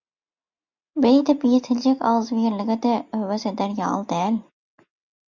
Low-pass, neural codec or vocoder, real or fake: 7.2 kHz; none; real